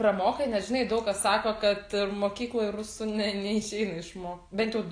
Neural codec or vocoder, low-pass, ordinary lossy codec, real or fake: none; 9.9 kHz; AAC, 48 kbps; real